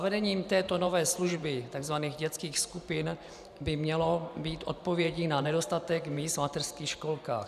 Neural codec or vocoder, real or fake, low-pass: vocoder, 44.1 kHz, 128 mel bands every 512 samples, BigVGAN v2; fake; 14.4 kHz